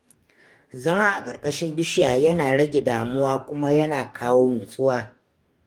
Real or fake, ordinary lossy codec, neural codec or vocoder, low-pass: fake; Opus, 32 kbps; codec, 44.1 kHz, 2.6 kbps, DAC; 19.8 kHz